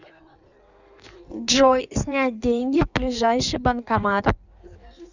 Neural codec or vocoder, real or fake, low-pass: codec, 16 kHz in and 24 kHz out, 1.1 kbps, FireRedTTS-2 codec; fake; 7.2 kHz